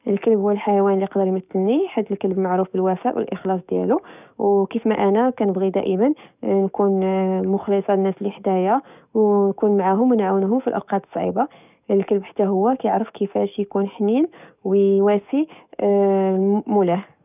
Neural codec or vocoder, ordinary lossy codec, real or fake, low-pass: codec, 44.1 kHz, 7.8 kbps, DAC; none; fake; 3.6 kHz